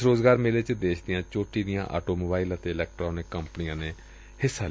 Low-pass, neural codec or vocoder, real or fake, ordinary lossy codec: none; none; real; none